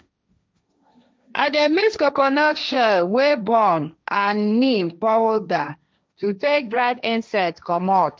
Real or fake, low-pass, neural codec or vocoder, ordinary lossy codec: fake; none; codec, 16 kHz, 1.1 kbps, Voila-Tokenizer; none